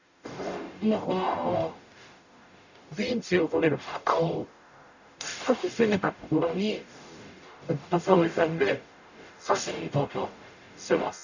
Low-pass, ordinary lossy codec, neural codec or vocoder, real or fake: 7.2 kHz; none; codec, 44.1 kHz, 0.9 kbps, DAC; fake